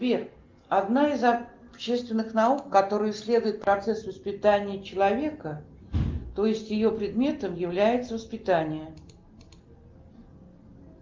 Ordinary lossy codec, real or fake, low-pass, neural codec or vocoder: Opus, 32 kbps; real; 7.2 kHz; none